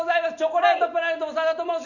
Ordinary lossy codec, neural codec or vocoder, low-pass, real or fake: none; none; 7.2 kHz; real